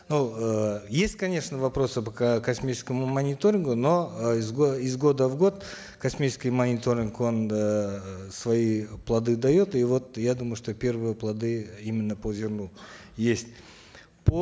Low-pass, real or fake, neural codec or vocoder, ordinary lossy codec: none; real; none; none